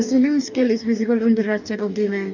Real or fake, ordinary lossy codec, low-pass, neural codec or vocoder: fake; none; 7.2 kHz; codec, 44.1 kHz, 2.6 kbps, DAC